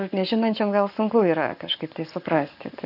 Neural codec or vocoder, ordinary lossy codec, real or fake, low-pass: codec, 44.1 kHz, 7.8 kbps, Pupu-Codec; AAC, 48 kbps; fake; 5.4 kHz